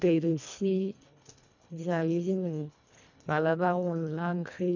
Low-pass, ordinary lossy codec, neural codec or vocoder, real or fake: 7.2 kHz; none; codec, 24 kHz, 1.5 kbps, HILCodec; fake